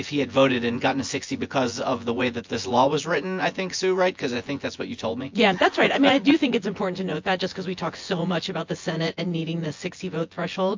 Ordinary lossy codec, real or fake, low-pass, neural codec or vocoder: MP3, 48 kbps; fake; 7.2 kHz; vocoder, 24 kHz, 100 mel bands, Vocos